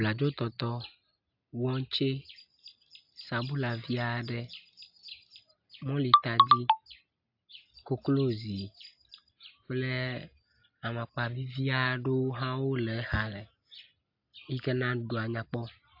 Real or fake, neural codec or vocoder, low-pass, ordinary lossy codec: real; none; 5.4 kHz; AAC, 48 kbps